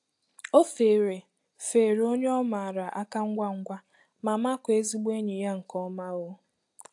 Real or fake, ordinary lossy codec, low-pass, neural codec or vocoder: real; AAC, 64 kbps; 10.8 kHz; none